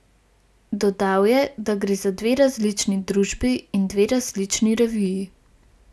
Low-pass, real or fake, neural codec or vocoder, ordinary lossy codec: none; real; none; none